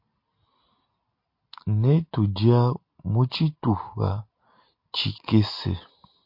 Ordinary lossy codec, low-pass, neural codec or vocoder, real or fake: MP3, 32 kbps; 5.4 kHz; none; real